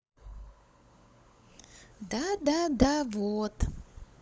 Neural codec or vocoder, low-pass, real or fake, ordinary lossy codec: codec, 16 kHz, 16 kbps, FunCodec, trained on LibriTTS, 50 frames a second; none; fake; none